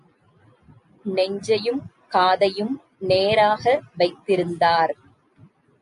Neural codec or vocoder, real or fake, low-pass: none; real; 10.8 kHz